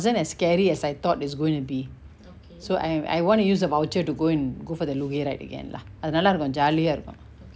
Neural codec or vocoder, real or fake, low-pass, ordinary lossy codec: none; real; none; none